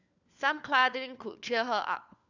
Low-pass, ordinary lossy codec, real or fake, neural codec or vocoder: 7.2 kHz; none; fake; codec, 16 kHz, 4 kbps, FunCodec, trained on LibriTTS, 50 frames a second